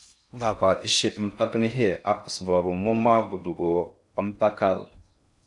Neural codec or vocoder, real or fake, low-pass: codec, 16 kHz in and 24 kHz out, 0.6 kbps, FocalCodec, streaming, 4096 codes; fake; 10.8 kHz